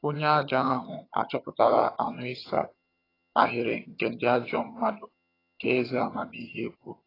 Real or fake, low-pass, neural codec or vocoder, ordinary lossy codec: fake; 5.4 kHz; vocoder, 22.05 kHz, 80 mel bands, HiFi-GAN; AAC, 24 kbps